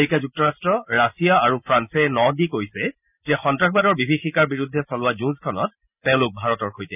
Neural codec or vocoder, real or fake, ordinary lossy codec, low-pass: none; real; none; 3.6 kHz